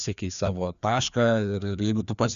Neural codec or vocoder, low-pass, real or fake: codec, 16 kHz, 2 kbps, FreqCodec, larger model; 7.2 kHz; fake